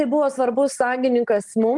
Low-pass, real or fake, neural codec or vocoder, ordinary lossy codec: 10.8 kHz; real; none; Opus, 24 kbps